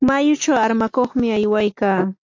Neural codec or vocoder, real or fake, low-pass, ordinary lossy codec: none; real; 7.2 kHz; AAC, 48 kbps